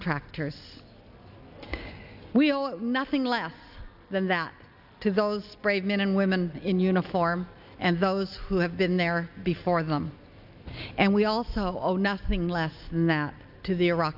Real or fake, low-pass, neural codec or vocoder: real; 5.4 kHz; none